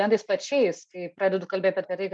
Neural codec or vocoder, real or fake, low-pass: none; real; 9.9 kHz